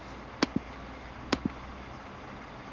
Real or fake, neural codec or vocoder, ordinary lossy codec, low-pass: real; none; Opus, 16 kbps; 7.2 kHz